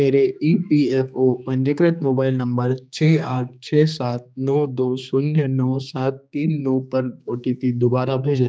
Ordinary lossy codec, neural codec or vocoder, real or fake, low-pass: none; codec, 16 kHz, 2 kbps, X-Codec, HuBERT features, trained on general audio; fake; none